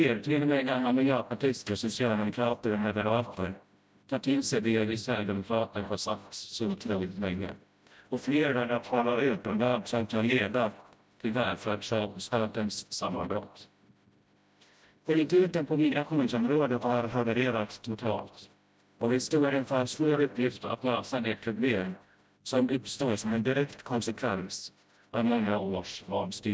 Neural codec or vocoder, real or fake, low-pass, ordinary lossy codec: codec, 16 kHz, 0.5 kbps, FreqCodec, smaller model; fake; none; none